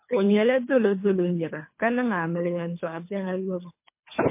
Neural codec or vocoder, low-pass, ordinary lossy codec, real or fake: codec, 24 kHz, 3 kbps, HILCodec; 3.6 kHz; MP3, 24 kbps; fake